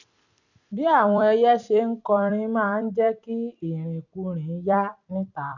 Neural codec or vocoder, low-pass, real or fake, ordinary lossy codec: vocoder, 44.1 kHz, 128 mel bands every 256 samples, BigVGAN v2; 7.2 kHz; fake; none